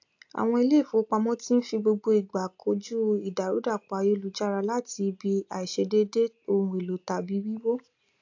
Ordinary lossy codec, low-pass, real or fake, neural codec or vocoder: AAC, 48 kbps; 7.2 kHz; real; none